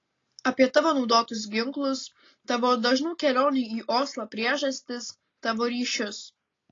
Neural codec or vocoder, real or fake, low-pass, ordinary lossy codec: none; real; 7.2 kHz; AAC, 32 kbps